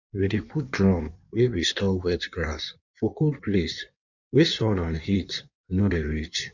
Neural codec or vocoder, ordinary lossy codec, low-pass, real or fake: codec, 16 kHz in and 24 kHz out, 1.1 kbps, FireRedTTS-2 codec; none; 7.2 kHz; fake